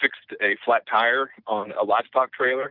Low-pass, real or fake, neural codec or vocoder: 5.4 kHz; real; none